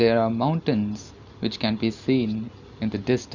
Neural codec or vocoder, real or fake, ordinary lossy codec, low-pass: none; real; none; 7.2 kHz